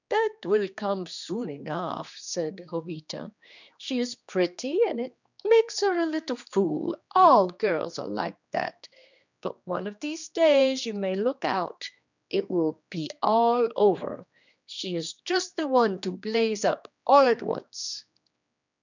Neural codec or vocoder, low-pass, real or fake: codec, 16 kHz, 2 kbps, X-Codec, HuBERT features, trained on general audio; 7.2 kHz; fake